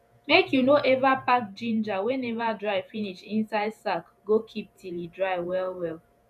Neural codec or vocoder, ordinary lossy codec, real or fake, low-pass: vocoder, 48 kHz, 128 mel bands, Vocos; none; fake; 14.4 kHz